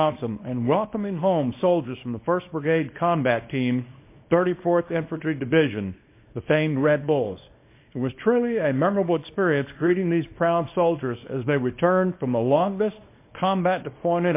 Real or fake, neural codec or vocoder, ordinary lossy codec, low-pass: fake; codec, 24 kHz, 0.9 kbps, WavTokenizer, small release; MP3, 24 kbps; 3.6 kHz